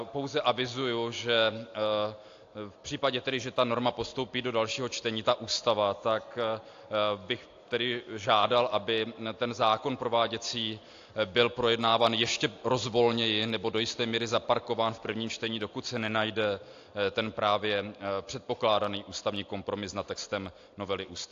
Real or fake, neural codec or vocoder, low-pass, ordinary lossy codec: real; none; 7.2 kHz; AAC, 48 kbps